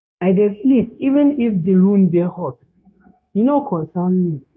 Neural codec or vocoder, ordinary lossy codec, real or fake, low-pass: codec, 16 kHz, 0.9 kbps, LongCat-Audio-Codec; none; fake; none